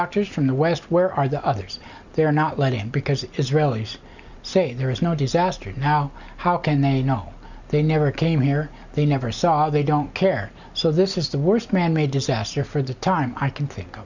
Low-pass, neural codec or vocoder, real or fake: 7.2 kHz; none; real